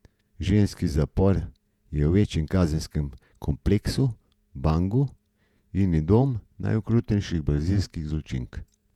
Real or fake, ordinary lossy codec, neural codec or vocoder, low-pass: fake; none; vocoder, 48 kHz, 128 mel bands, Vocos; 19.8 kHz